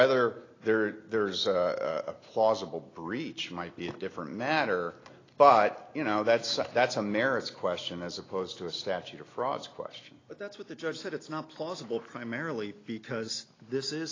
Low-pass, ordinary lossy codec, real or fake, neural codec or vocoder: 7.2 kHz; AAC, 32 kbps; real; none